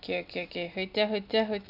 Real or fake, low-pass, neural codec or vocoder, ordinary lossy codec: real; 5.4 kHz; none; none